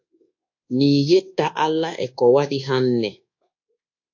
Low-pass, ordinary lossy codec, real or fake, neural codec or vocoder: 7.2 kHz; AAC, 48 kbps; fake; codec, 24 kHz, 1.2 kbps, DualCodec